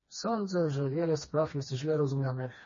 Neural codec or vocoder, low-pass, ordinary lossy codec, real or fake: codec, 16 kHz, 2 kbps, FreqCodec, smaller model; 7.2 kHz; MP3, 32 kbps; fake